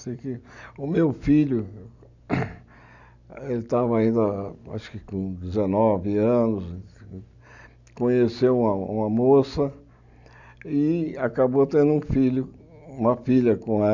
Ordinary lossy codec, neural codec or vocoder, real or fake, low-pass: none; none; real; 7.2 kHz